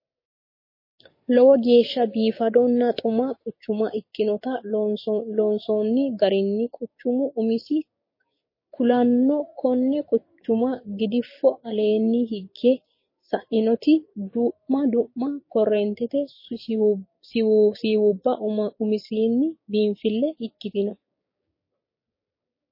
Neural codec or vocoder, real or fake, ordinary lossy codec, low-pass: codec, 16 kHz, 6 kbps, DAC; fake; MP3, 24 kbps; 5.4 kHz